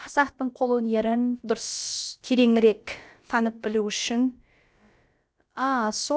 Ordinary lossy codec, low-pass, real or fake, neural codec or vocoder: none; none; fake; codec, 16 kHz, about 1 kbps, DyCAST, with the encoder's durations